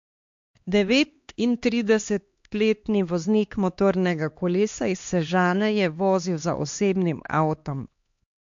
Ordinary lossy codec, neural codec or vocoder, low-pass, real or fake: MP3, 48 kbps; codec, 16 kHz, 2 kbps, X-Codec, HuBERT features, trained on LibriSpeech; 7.2 kHz; fake